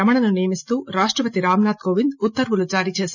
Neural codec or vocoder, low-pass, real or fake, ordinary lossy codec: none; 7.2 kHz; real; none